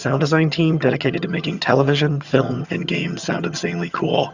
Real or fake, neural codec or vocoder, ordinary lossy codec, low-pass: fake; vocoder, 22.05 kHz, 80 mel bands, HiFi-GAN; Opus, 64 kbps; 7.2 kHz